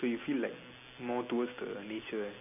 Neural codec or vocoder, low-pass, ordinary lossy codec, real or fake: none; 3.6 kHz; none; real